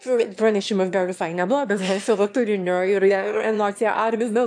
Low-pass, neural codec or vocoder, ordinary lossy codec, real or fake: 9.9 kHz; autoencoder, 22.05 kHz, a latent of 192 numbers a frame, VITS, trained on one speaker; AAC, 64 kbps; fake